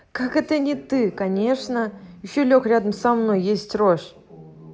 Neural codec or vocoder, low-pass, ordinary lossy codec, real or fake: none; none; none; real